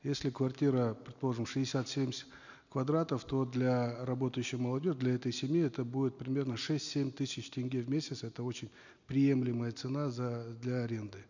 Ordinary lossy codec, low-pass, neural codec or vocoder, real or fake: none; 7.2 kHz; none; real